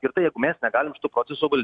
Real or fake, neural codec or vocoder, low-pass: real; none; 9.9 kHz